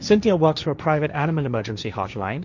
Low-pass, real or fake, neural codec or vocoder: 7.2 kHz; fake; codec, 16 kHz, 1.1 kbps, Voila-Tokenizer